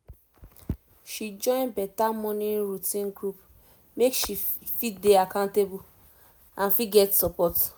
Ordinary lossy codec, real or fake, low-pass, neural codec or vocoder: none; real; none; none